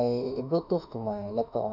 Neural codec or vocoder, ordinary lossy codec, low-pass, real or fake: autoencoder, 48 kHz, 32 numbers a frame, DAC-VAE, trained on Japanese speech; none; 5.4 kHz; fake